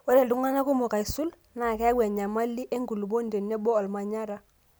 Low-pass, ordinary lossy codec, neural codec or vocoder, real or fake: none; none; none; real